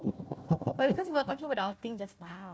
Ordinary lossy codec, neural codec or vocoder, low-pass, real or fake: none; codec, 16 kHz, 1 kbps, FunCodec, trained on Chinese and English, 50 frames a second; none; fake